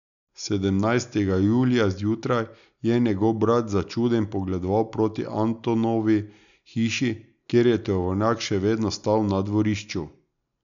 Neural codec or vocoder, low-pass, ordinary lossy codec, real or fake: none; 7.2 kHz; none; real